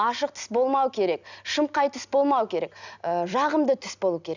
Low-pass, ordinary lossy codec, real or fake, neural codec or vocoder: 7.2 kHz; none; real; none